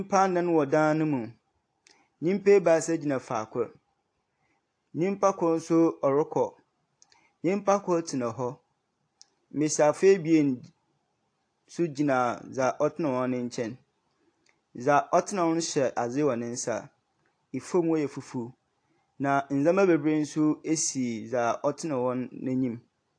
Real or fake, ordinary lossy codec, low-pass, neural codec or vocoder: real; AAC, 48 kbps; 9.9 kHz; none